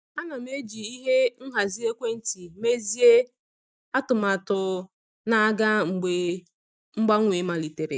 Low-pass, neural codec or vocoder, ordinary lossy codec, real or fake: none; none; none; real